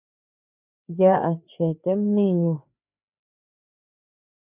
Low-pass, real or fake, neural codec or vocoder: 3.6 kHz; fake; codec, 16 kHz, 4 kbps, FreqCodec, larger model